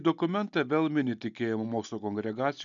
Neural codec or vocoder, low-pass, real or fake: none; 7.2 kHz; real